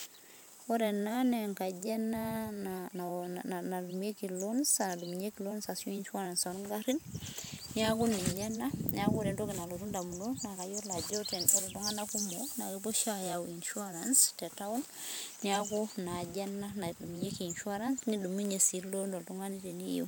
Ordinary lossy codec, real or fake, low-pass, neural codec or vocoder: none; fake; none; vocoder, 44.1 kHz, 128 mel bands every 512 samples, BigVGAN v2